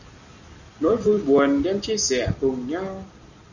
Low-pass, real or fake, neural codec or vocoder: 7.2 kHz; real; none